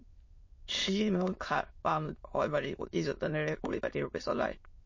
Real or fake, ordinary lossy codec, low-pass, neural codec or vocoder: fake; MP3, 32 kbps; 7.2 kHz; autoencoder, 22.05 kHz, a latent of 192 numbers a frame, VITS, trained on many speakers